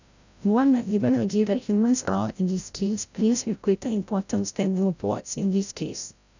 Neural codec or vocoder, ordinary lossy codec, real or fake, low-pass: codec, 16 kHz, 0.5 kbps, FreqCodec, larger model; none; fake; 7.2 kHz